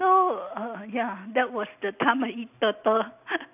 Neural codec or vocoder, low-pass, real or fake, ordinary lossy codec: none; 3.6 kHz; real; none